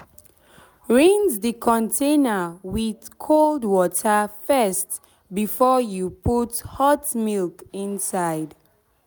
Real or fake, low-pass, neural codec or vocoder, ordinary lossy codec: real; none; none; none